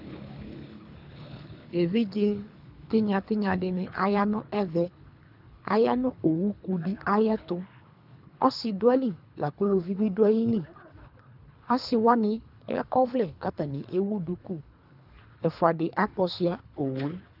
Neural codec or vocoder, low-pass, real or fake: codec, 24 kHz, 3 kbps, HILCodec; 5.4 kHz; fake